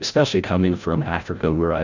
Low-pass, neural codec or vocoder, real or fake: 7.2 kHz; codec, 16 kHz, 0.5 kbps, FreqCodec, larger model; fake